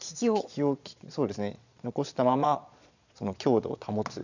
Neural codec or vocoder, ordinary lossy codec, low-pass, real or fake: vocoder, 22.05 kHz, 80 mel bands, Vocos; none; 7.2 kHz; fake